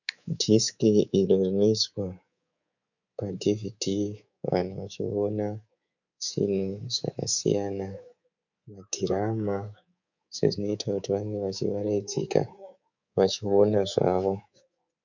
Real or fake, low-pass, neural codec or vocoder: fake; 7.2 kHz; codec, 24 kHz, 3.1 kbps, DualCodec